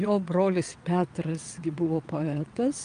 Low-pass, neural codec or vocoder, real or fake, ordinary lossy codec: 9.9 kHz; vocoder, 22.05 kHz, 80 mel bands, WaveNeXt; fake; Opus, 32 kbps